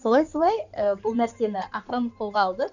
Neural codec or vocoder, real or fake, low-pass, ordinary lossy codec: codec, 16 kHz in and 24 kHz out, 2.2 kbps, FireRedTTS-2 codec; fake; 7.2 kHz; none